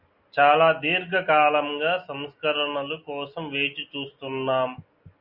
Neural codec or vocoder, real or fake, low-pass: none; real; 5.4 kHz